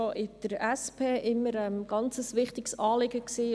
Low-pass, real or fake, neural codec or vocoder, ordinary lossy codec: none; real; none; none